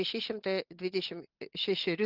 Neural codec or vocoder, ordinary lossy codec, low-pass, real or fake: none; Opus, 24 kbps; 5.4 kHz; real